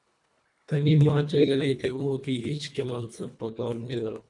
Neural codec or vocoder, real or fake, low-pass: codec, 24 kHz, 1.5 kbps, HILCodec; fake; 10.8 kHz